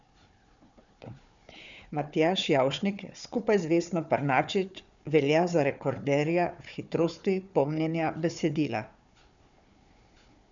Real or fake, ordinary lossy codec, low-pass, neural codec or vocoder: fake; none; 7.2 kHz; codec, 16 kHz, 4 kbps, FunCodec, trained on Chinese and English, 50 frames a second